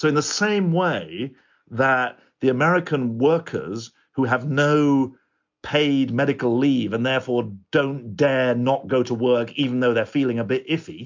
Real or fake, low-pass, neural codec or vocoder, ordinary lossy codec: real; 7.2 kHz; none; MP3, 64 kbps